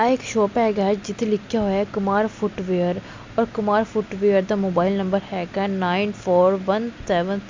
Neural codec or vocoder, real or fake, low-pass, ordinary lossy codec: none; real; 7.2 kHz; MP3, 64 kbps